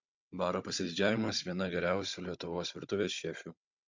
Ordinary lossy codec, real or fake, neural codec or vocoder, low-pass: MP3, 64 kbps; fake; codec, 16 kHz, 16 kbps, FunCodec, trained on Chinese and English, 50 frames a second; 7.2 kHz